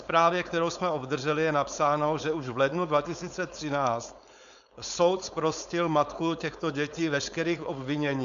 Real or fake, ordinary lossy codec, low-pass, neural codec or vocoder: fake; AAC, 96 kbps; 7.2 kHz; codec, 16 kHz, 4.8 kbps, FACodec